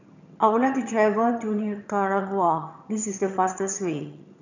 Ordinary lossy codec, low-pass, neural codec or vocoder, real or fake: none; 7.2 kHz; vocoder, 22.05 kHz, 80 mel bands, HiFi-GAN; fake